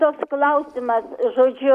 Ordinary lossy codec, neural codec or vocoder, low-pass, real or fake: MP3, 96 kbps; none; 14.4 kHz; real